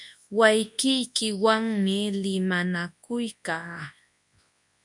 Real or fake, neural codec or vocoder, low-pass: fake; codec, 24 kHz, 0.9 kbps, WavTokenizer, large speech release; 10.8 kHz